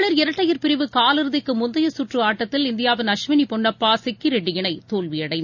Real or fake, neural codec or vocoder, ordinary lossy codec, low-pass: real; none; none; 7.2 kHz